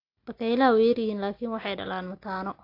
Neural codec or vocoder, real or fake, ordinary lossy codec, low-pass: none; real; AAC, 32 kbps; 5.4 kHz